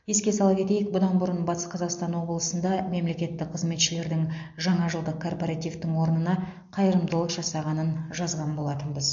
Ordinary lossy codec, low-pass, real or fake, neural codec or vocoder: MP3, 48 kbps; 7.2 kHz; real; none